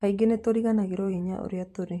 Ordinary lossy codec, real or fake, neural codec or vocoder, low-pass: MP3, 64 kbps; fake; vocoder, 44.1 kHz, 128 mel bands every 512 samples, BigVGAN v2; 14.4 kHz